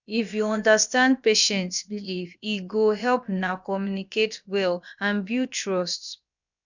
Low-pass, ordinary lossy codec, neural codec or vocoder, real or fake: 7.2 kHz; none; codec, 16 kHz, about 1 kbps, DyCAST, with the encoder's durations; fake